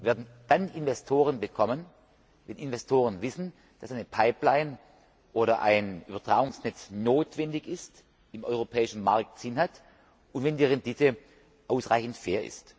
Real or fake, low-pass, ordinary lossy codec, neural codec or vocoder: real; none; none; none